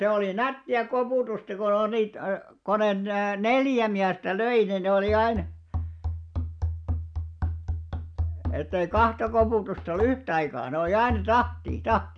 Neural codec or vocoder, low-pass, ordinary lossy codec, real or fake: none; 9.9 kHz; MP3, 96 kbps; real